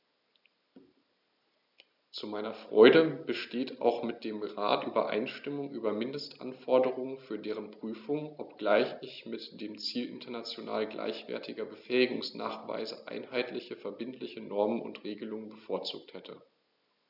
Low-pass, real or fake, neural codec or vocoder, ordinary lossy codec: 5.4 kHz; real; none; none